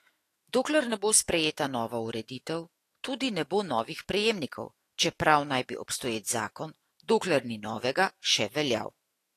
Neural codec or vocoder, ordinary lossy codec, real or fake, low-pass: autoencoder, 48 kHz, 128 numbers a frame, DAC-VAE, trained on Japanese speech; AAC, 48 kbps; fake; 14.4 kHz